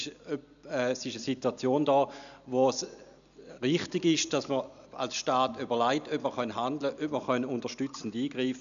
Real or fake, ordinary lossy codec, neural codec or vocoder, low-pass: real; none; none; 7.2 kHz